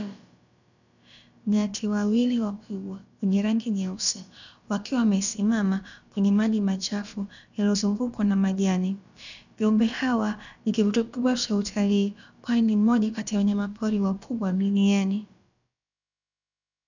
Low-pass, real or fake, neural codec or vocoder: 7.2 kHz; fake; codec, 16 kHz, about 1 kbps, DyCAST, with the encoder's durations